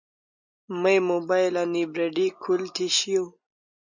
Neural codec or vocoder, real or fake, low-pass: none; real; 7.2 kHz